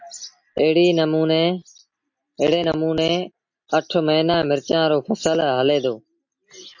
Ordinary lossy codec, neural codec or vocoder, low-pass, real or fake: MP3, 48 kbps; none; 7.2 kHz; real